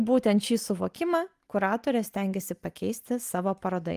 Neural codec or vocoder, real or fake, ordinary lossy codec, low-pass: none; real; Opus, 32 kbps; 14.4 kHz